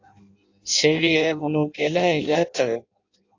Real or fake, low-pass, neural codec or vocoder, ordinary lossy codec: fake; 7.2 kHz; codec, 16 kHz in and 24 kHz out, 0.6 kbps, FireRedTTS-2 codec; AAC, 48 kbps